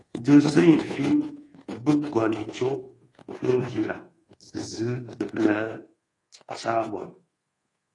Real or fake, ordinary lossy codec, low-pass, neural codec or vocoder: fake; AAC, 32 kbps; 10.8 kHz; codec, 24 kHz, 1.2 kbps, DualCodec